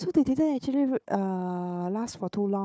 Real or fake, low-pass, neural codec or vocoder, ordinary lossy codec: fake; none; codec, 16 kHz, 4 kbps, FunCodec, trained on Chinese and English, 50 frames a second; none